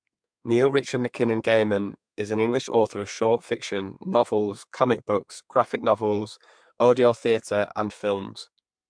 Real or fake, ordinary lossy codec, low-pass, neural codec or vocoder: fake; MP3, 64 kbps; 9.9 kHz; codec, 32 kHz, 1.9 kbps, SNAC